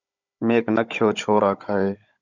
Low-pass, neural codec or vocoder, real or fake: 7.2 kHz; codec, 16 kHz, 16 kbps, FunCodec, trained on Chinese and English, 50 frames a second; fake